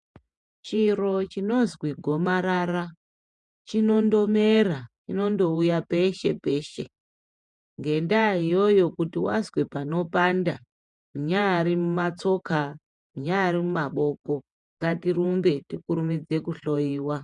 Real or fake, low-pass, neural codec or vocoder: fake; 10.8 kHz; vocoder, 48 kHz, 128 mel bands, Vocos